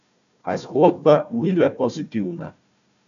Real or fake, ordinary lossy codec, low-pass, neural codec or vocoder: fake; none; 7.2 kHz; codec, 16 kHz, 1 kbps, FunCodec, trained on Chinese and English, 50 frames a second